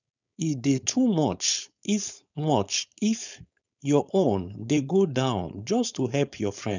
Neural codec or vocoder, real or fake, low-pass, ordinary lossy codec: codec, 16 kHz, 4.8 kbps, FACodec; fake; 7.2 kHz; none